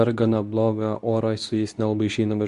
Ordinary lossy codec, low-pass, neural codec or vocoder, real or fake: Opus, 64 kbps; 10.8 kHz; codec, 24 kHz, 0.9 kbps, WavTokenizer, medium speech release version 2; fake